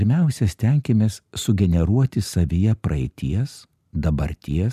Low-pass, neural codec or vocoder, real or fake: 14.4 kHz; none; real